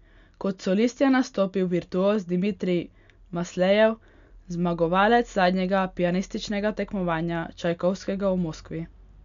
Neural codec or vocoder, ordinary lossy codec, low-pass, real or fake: none; MP3, 96 kbps; 7.2 kHz; real